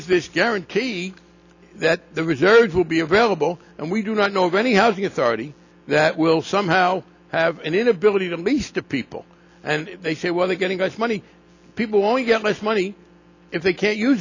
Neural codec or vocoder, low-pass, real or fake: none; 7.2 kHz; real